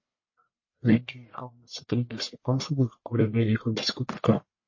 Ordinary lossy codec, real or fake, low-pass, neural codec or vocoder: MP3, 32 kbps; fake; 7.2 kHz; codec, 44.1 kHz, 1.7 kbps, Pupu-Codec